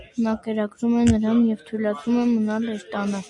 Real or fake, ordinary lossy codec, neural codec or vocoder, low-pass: real; MP3, 48 kbps; none; 10.8 kHz